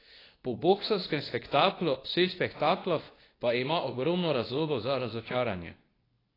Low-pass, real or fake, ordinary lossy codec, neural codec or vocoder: 5.4 kHz; fake; AAC, 24 kbps; codec, 24 kHz, 0.9 kbps, WavTokenizer, medium speech release version 2